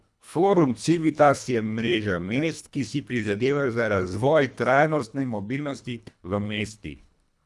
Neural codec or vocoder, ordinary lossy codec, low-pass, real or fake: codec, 24 kHz, 1.5 kbps, HILCodec; none; none; fake